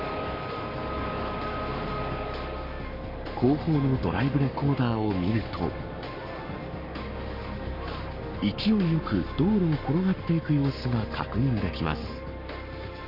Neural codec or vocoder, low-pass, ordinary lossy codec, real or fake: codec, 44.1 kHz, 7.8 kbps, Pupu-Codec; 5.4 kHz; none; fake